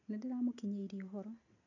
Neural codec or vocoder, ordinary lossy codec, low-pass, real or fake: none; none; 7.2 kHz; real